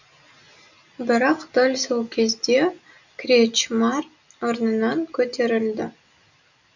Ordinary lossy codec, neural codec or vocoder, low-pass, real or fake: none; none; 7.2 kHz; real